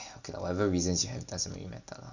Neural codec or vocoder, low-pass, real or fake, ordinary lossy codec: none; 7.2 kHz; real; none